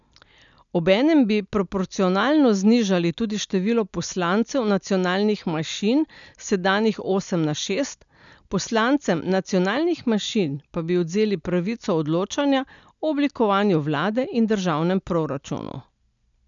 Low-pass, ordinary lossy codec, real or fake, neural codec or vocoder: 7.2 kHz; none; real; none